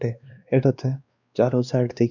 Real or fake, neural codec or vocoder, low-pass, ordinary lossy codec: fake; codec, 16 kHz, 2 kbps, X-Codec, WavLM features, trained on Multilingual LibriSpeech; 7.2 kHz; none